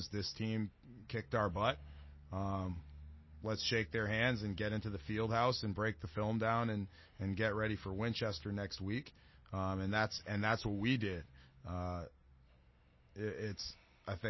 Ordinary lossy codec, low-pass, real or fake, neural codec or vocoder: MP3, 24 kbps; 7.2 kHz; real; none